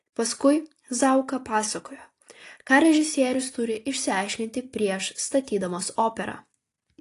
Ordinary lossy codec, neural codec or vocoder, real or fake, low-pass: AAC, 48 kbps; none; real; 14.4 kHz